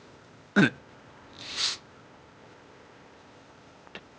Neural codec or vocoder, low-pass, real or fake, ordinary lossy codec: codec, 16 kHz, 0.8 kbps, ZipCodec; none; fake; none